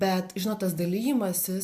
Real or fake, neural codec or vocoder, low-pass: real; none; 14.4 kHz